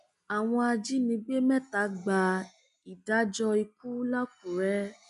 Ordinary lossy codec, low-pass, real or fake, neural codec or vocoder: none; 10.8 kHz; real; none